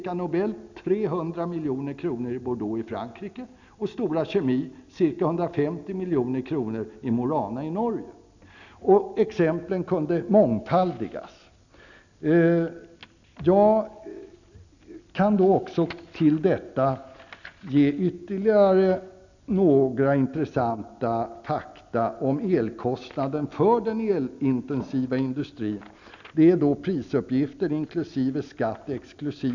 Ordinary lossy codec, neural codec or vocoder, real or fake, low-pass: none; none; real; 7.2 kHz